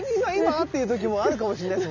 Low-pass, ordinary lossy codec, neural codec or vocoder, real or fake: 7.2 kHz; none; none; real